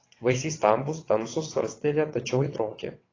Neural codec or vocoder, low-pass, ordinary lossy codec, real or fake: vocoder, 22.05 kHz, 80 mel bands, WaveNeXt; 7.2 kHz; AAC, 32 kbps; fake